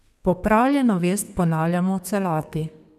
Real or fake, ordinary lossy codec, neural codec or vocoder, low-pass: fake; none; codec, 32 kHz, 1.9 kbps, SNAC; 14.4 kHz